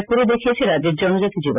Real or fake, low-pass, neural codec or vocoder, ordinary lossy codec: real; 3.6 kHz; none; none